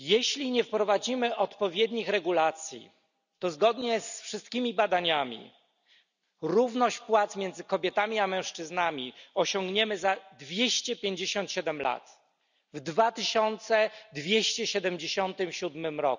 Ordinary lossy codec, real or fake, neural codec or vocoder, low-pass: none; real; none; 7.2 kHz